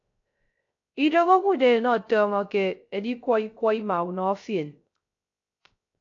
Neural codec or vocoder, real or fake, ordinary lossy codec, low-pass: codec, 16 kHz, 0.3 kbps, FocalCodec; fake; AAC, 48 kbps; 7.2 kHz